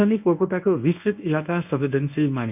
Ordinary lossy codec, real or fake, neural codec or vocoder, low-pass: none; fake; codec, 24 kHz, 0.9 kbps, WavTokenizer, medium speech release version 1; 3.6 kHz